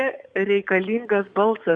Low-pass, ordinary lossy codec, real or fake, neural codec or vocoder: 9.9 kHz; Opus, 64 kbps; fake; codec, 44.1 kHz, 7.8 kbps, DAC